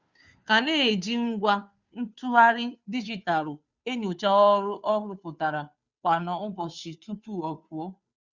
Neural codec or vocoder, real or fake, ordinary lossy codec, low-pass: codec, 16 kHz, 2 kbps, FunCodec, trained on Chinese and English, 25 frames a second; fake; Opus, 64 kbps; 7.2 kHz